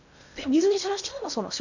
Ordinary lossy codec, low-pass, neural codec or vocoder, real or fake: none; 7.2 kHz; codec, 16 kHz in and 24 kHz out, 0.6 kbps, FocalCodec, streaming, 2048 codes; fake